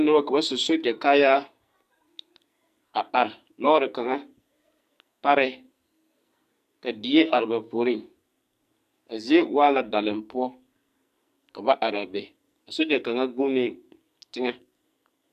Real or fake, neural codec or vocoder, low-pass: fake; codec, 44.1 kHz, 2.6 kbps, SNAC; 14.4 kHz